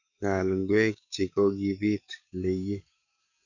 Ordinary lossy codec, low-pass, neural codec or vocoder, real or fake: none; 7.2 kHz; codec, 44.1 kHz, 7.8 kbps, DAC; fake